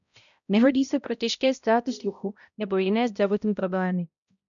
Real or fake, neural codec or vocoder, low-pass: fake; codec, 16 kHz, 0.5 kbps, X-Codec, HuBERT features, trained on balanced general audio; 7.2 kHz